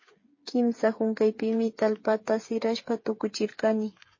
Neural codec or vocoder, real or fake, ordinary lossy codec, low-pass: codec, 16 kHz, 8 kbps, FreqCodec, smaller model; fake; MP3, 32 kbps; 7.2 kHz